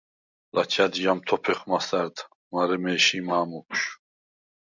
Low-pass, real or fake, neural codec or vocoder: 7.2 kHz; real; none